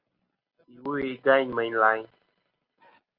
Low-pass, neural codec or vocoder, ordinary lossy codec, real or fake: 5.4 kHz; none; Opus, 32 kbps; real